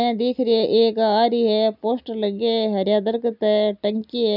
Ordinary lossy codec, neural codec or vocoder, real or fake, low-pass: none; none; real; 5.4 kHz